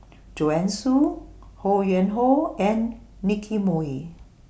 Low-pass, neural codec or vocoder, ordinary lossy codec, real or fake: none; none; none; real